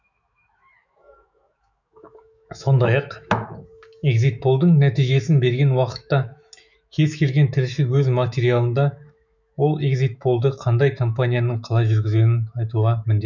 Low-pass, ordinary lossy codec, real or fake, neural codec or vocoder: 7.2 kHz; none; fake; autoencoder, 48 kHz, 128 numbers a frame, DAC-VAE, trained on Japanese speech